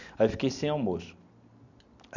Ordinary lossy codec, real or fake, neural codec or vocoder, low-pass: none; real; none; 7.2 kHz